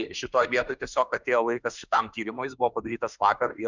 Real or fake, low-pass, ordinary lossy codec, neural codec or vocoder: fake; 7.2 kHz; Opus, 64 kbps; autoencoder, 48 kHz, 32 numbers a frame, DAC-VAE, trained on Japanese speech